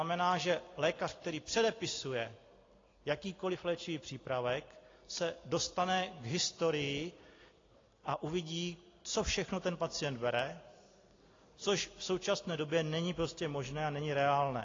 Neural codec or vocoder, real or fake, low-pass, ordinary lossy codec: none; real; 7.2 kHz; AAC, 32 kbps